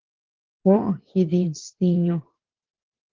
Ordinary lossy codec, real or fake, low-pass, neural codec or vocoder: Opus, 16 kbps; fake; 7.2 kHz; vocoder, 44.1 kHz, 128 mel bands every 512 samples, BigVGAN v2